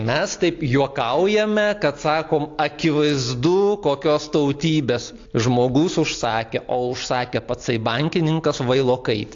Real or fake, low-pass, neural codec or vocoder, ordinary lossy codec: real; 7.2 kHz; none; AAC, 48 kbps